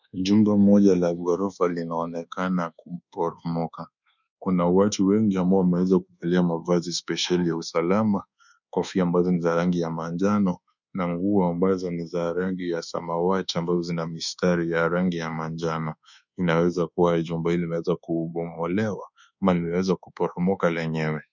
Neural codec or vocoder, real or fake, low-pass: codec, 24 kHz, 1.2 kbps, DualCodec; fake; 7.2 kHz